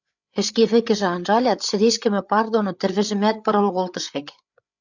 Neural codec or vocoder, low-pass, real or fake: codec, 16 kHz, 8 kbps, FreqCodec, larger model; 7.2 kHz; fake